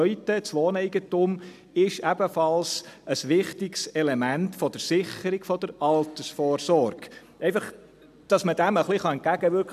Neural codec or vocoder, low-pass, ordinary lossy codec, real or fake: none; 14.4 kHz; none; real